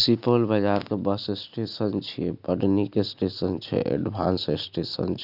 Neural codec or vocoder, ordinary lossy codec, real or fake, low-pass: none; none; real; 5.4 kHz